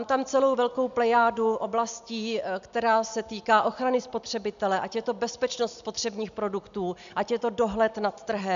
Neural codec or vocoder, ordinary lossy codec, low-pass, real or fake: none; AAC, 96 kbps; 7.2 kHz; real